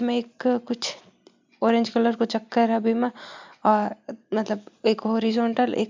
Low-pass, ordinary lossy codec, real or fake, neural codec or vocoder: 7.2 kHz; MP3, 64 kbps; real; none